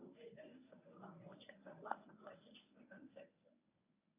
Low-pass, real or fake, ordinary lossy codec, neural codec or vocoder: 3.6 kHz; fake; AAC, 24 kbps; codec, 24 kHz, 0.9 kbps, WavTokenizer, medium speech release version 1